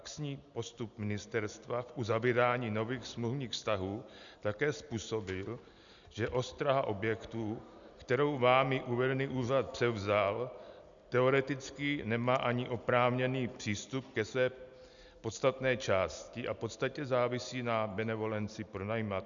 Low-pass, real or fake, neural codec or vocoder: 7.2 kHz; real; none